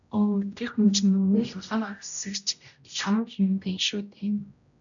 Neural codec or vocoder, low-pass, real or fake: codec, 16 kHz, 0.5 kbps, X-Codec, HuBERT features, trained on general audio; 7.2 kHz; fake